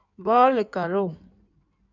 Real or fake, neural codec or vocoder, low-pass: fake; codec, 16 kHz in and 24 kHz out, 1.1 kbps, FireRedTTS-2 codec; 7.2 kHz